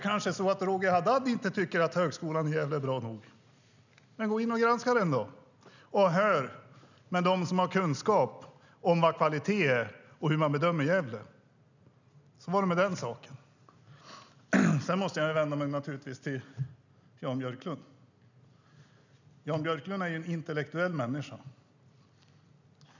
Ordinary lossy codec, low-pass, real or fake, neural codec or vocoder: none; 7.2 kHz; real; none